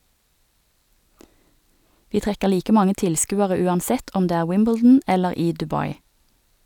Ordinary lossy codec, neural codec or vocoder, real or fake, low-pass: none; none; real; 19.8 kHz